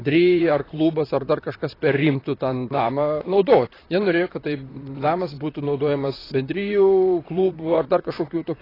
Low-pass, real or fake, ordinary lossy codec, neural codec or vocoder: 5.4 kHz; fake; AAC, 24 kbps; vocoder, 44.1 kHz, 128 mel bands, Pupu-Vocoder